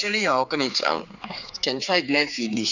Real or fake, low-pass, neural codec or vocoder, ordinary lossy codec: fake; 7.2 kHz; codec, 16 kHz, 4 kbps, X-Codec, HuBERT features, trained on general audio; none